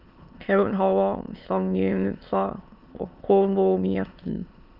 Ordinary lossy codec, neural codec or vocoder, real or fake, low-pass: Opus, 32 kbps; autoencoder, 22.05 kHz, a latent of 192 numbers a frame, VITS, trained on many speakers; fake; 5.4 kHz